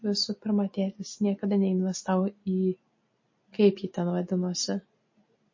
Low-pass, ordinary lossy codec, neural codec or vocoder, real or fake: 7.2 kHz; MP3, 32 kbps; none; real